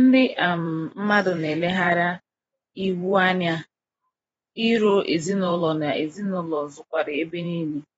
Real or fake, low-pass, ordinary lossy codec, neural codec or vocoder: fake; 19.8 kHz; AAC, 24 kbps; vocoder, 44.1 kHz, 128 mel bands every 512 samples, BigVGAN v2